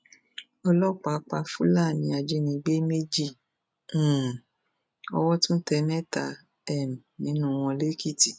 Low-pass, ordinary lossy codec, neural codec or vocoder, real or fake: none; none; none; real